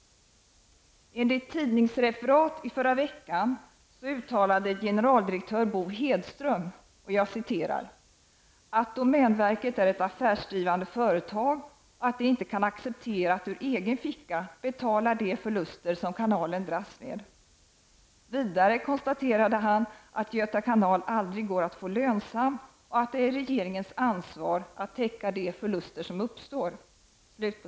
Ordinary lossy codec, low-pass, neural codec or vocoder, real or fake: none; none; none; real